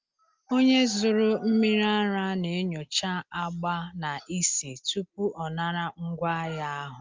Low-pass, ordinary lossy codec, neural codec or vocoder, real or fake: 7.2 kHz; Opus, 32 kbps; none; real